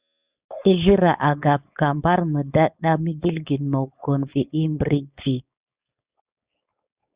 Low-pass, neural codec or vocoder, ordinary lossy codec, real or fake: 3.6 kHz; codec, 16 kHz, 4.8 kbps, FACodec; Opus, 64 kbps; fake